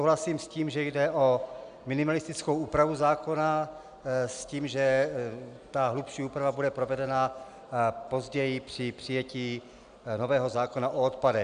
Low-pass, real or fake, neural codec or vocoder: 9.9 kHz; real; none